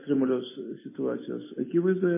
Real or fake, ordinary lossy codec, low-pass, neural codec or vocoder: real; MP3, 16 kbps; 3.6 kHz; none